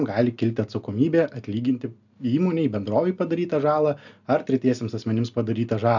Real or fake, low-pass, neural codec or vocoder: real; 7.2 kHz; none